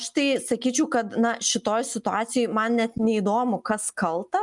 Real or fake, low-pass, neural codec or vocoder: real; 10.8 kHz; none